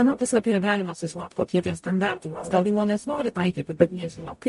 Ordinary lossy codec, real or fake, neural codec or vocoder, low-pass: MP3, 48 kbps; fake; codec, 44.1 kHz, 0.9 kbps, DAC; 14.4 kHz